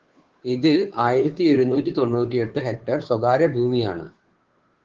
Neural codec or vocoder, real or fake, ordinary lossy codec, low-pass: codec, 16 kHz, 2 kbps, FunCodec, trained on Chinese and English, 25 frames a second; fake; Opus, 16 kbps; 7.2 kHz